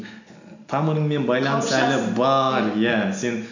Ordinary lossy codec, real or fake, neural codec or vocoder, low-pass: none; real; none; 7.2 kHz